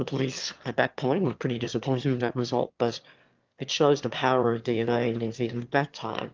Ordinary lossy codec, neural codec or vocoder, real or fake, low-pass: Opus, 24 kbps; autoencoder, 22.05 kHz, a latent of 192 numbers a frame, VITS, trained on one speaker; fake; 7.2 kHz